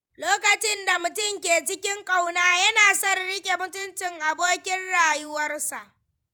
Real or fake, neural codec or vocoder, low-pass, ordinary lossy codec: fake; vocoder, 48 kHz, 128 mel bands, Vocos; none; none